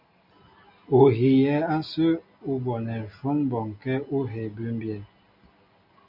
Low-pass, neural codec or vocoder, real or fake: 5.4 kHz; none; real